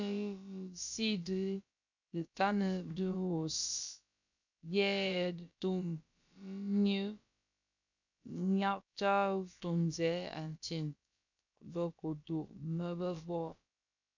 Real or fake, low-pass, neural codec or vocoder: fake; 7.2 kHz; codec, 16 kHz, about 1 kbps, DyCAST, with the encoder's durations